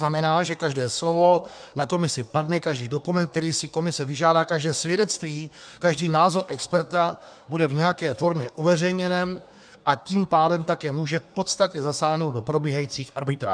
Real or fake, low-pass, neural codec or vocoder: fake; 9.9 kHz; codec, 24 kHz, 1 kbps, SNAC